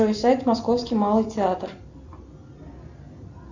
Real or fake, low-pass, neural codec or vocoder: real; 7.2 kHz; none